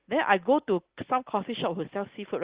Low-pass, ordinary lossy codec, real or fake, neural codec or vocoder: 3.6 kHz; Opus, 16 kbps; real; none